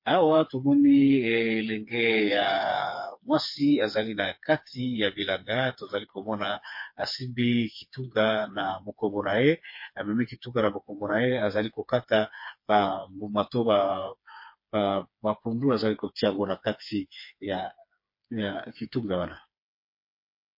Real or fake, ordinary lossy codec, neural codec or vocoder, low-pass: fake; MP3, 32 kbps; codec, 16 kHz, 4 kbps, FreqCodec, smaller model; 5.4 kHz